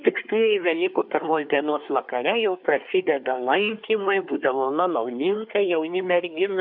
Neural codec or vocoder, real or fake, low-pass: codec, 24 kHz, 1 kbps, SNAC; fake; 5.4 kHz